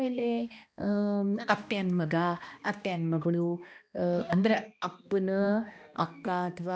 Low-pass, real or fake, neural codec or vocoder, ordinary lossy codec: none; fake; codec, 16 kHz, 1 kbps, X-Codec, HuBERT features, trained on balanced general audio; none